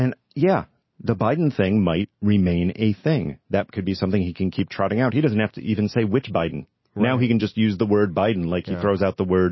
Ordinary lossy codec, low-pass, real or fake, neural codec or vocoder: MP3, 24 kbps; 7.2 kHz; real; none